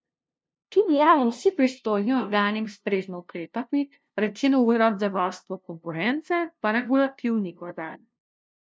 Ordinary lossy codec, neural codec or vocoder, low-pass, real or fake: none; codec, 16 kHz, 0.5 kbps, FunCodec, trained on LibriTTS, 25 frames a second; none; fake